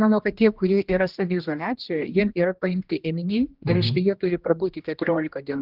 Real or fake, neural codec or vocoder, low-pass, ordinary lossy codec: fake; codec, 16 kHz, 1 kbps, X-Codec, HuBERT features, trained on general audio; 5.4 kHz; Opus, 24 kbps